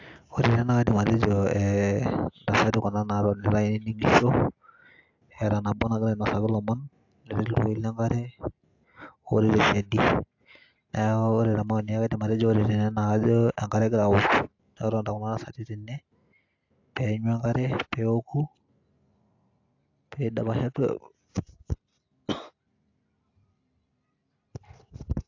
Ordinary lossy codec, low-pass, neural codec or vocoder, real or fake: AAC, 48 kbps; 7.2 kHz; none; real